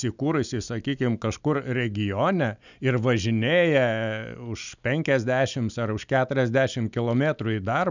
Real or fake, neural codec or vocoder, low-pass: real; none; 7.2 kHz